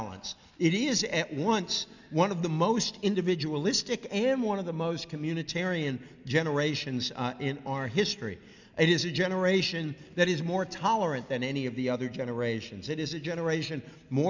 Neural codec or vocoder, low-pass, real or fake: none; 7.2 kHz; real